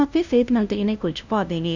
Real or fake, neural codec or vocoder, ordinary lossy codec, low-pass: fake; codec, 16 kHz, 0.5 kbps, FunCodec, trained on LibriTTS, 25 frames a second; Opus, 64 kbps; 7.2 kHz